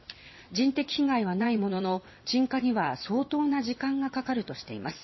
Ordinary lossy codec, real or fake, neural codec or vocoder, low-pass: MP3, 24 kbps; fake; vocoder, 22.05 kHz, 80 mel bands, WaveNeXt; 7.2 kHz